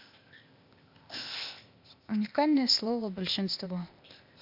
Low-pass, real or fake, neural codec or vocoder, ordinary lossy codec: 5.4 kHz; fake; codec, 16 kHz, 0.8 kbps, ZipCodec; MP3, 48 kbps